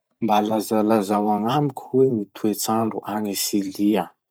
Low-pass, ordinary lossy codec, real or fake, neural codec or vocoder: none; none; fake; vocoder, 44.1 kHz, 128 mel bands every 512 samples, BigVGAN v2